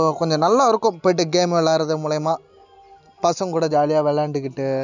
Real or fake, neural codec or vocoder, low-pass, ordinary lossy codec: real; none; 7.2 kHz; none